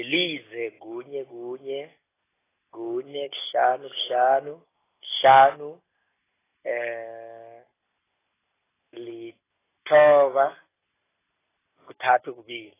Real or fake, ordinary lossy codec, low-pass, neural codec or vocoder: real; AAC, 16 kbps; 3.6 kHz; none